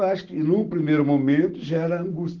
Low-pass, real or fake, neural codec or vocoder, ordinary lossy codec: 7.2 kHz; real; none; Opus, 16 kbps